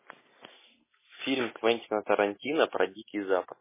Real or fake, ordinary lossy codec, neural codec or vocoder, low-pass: real; MP3, 16 kbps; none; 3.6 kHz